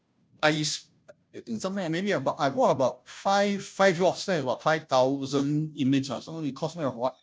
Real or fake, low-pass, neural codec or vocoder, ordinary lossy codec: fake; none; codec, 16 kHz, 0.5 kbps, FunCodec, trained on Chinese and English, 25 frames a second; none